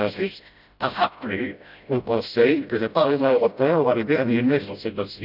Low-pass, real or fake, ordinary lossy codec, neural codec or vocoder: 5.4 kHz; fake; none; codec, 16 kHz, 0.5 kbps, FreqCodec, smaller model